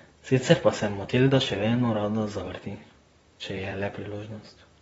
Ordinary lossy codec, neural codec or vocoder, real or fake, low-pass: AAC, 24 kbps; vocoder, 44.1 kHz, 128 mel bands, Pupu-Vocoder; fake; 19.8 kHz